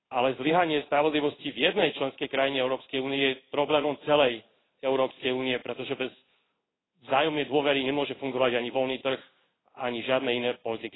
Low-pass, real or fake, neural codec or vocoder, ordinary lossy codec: 7.2 kHz; fake; codec, 16 kHz in and 24 kHz out, 1 kbps, XY-Tokenizer; AAC, 16 kbps